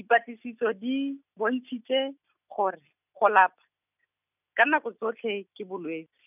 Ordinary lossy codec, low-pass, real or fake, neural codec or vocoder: none; 3.6 kHz; real; none